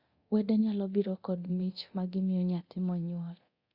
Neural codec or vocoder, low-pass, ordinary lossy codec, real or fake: codec, 24 kHz, 0.9 kbps, DualCodec; 5.4 kHz; Opus, 64 kbps; fake